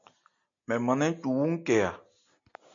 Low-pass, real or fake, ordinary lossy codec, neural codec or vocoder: 7.2 kHz; real; MP3, 64 kbps; none